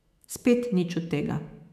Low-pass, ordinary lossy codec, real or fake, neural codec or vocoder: 14.4 kHz; none; fake; autoencoder, 48 kHz, 128 numbers a frame, DAC-VAE, trained on Japanese speech